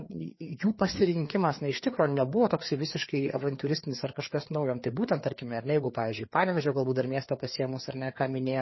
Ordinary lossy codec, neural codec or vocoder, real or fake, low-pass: MP3, 24 kbps; codec, 16 kHz, 4 kbps, FreqCodec, larger model; fake; 7.2 kHz